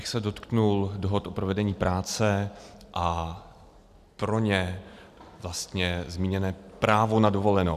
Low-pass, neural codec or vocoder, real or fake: 14.4 kHz; none; real